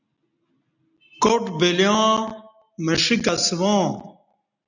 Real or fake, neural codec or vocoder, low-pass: real; none; 7.2 kHz